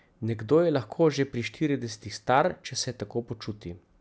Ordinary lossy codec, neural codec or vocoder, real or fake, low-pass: none; none; real; none